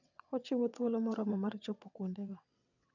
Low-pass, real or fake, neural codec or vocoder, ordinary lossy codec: 7.2 kHz; fake; vocoder, 22.05 kHz, 80 mel bands, WaveNeXt; none